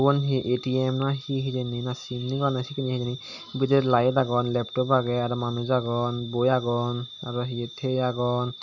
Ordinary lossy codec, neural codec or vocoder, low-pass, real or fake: none; none; 7.2 kHz; real